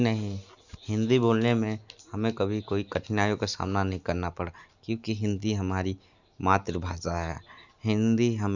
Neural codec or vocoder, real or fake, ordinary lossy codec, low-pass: none; real; none; 7.2 kHz